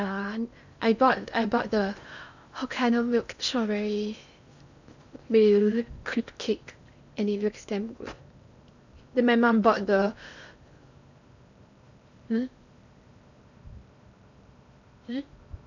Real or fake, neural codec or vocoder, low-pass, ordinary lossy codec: fake; codec, 16 kHz in and 24 kHz out, 0.8 kbps, FocalCodec, streaming, 65536 codes; 7.2 kHz; none